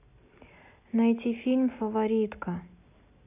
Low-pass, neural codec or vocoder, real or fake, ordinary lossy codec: 3.6 kHz; none; real; none